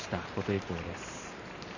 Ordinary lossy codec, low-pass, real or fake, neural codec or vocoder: none; 7.2 kHz; fake; vocoder, 44.1 kHz, 128 mel bands every 512 samples, BigVGAN v2